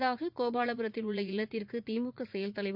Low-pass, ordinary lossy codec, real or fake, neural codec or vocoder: 5.4 kHz; none; fake; vocoder, 44.1 kHz, 80 mel bands, Vocos